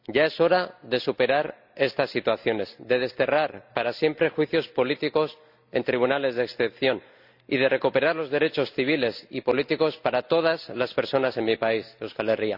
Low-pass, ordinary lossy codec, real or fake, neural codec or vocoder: 5.4 kHz; none; real; none